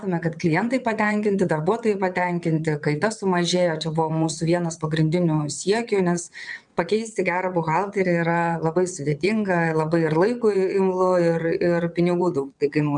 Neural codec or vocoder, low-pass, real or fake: vocoder, 22.05 kHz, 80 mel bands, WaveNeXt; 9.9 kHz; fake